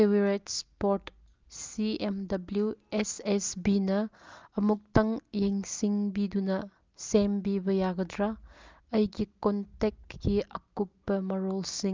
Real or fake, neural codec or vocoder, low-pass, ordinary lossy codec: real; none; 7.2 kHz; Opus, 24 kbps